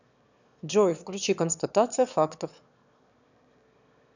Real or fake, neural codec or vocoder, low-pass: fake; autoencoder, 22.05 kHz, a latent of 192 numbers a frame, VITS, trained on one speaker; 7.2 kHz